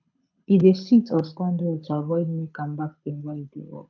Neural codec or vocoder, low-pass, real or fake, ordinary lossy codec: codec, 24 kHz, 6 kbps, HILCodec; 7.2 kHz; fake; none